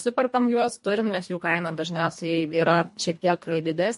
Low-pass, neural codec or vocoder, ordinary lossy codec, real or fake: 10.8 kHz; codec, 24 kHz, 1.5 kbps, HILCodec; MP3, 48 kbps; fake